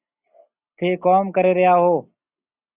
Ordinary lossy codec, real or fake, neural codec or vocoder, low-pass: Opus, 64 kbps; real; none; 3.6 kHz